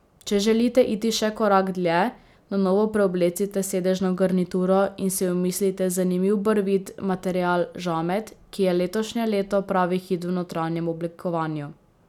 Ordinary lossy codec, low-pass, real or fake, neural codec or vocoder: none; 19.8 kHz; real; none